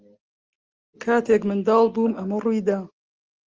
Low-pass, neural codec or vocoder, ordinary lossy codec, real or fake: 7.2 kHz; none; Opus, 24 kbps; real